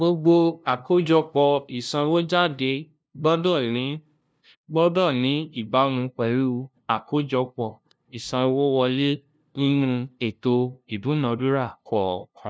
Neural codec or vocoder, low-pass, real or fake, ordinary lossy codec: codec, 16 kHz, 0.5 kbps, FunCodec, trained on LibriTTS, 25 frames a second; none; fake; none